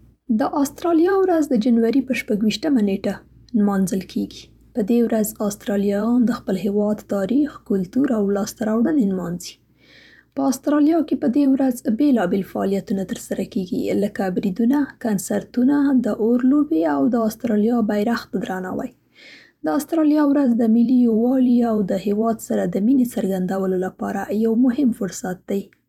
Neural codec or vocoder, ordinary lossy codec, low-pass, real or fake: vocoder, 44.1 kHz, 128 mel bands every 512 samples, BigVGAN v2; none; 19.8 kHz; fake